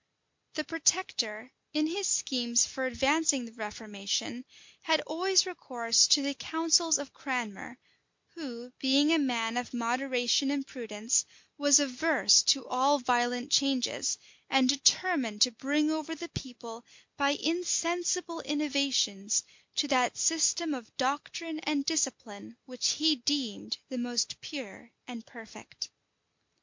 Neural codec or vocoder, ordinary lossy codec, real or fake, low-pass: none; MP3, 48 kbps; real; 7.2 kHz